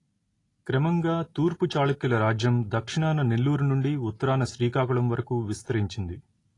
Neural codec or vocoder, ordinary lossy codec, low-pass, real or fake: none; AAC, 32 kbps; 10.8 kHz; real